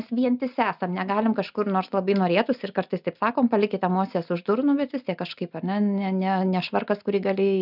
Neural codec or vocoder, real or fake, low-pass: none; real; 5.4 kHz